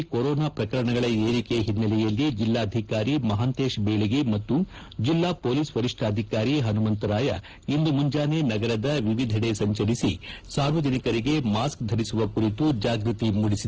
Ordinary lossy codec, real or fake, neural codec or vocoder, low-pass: Opus, 16 kbps; real; none; 7.2 kHz